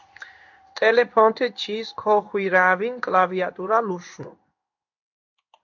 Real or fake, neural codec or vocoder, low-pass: fake; codec, 16 kHz in and 24 kHz out, 1 kbps, XY-Tokenizer; 7.2 kHz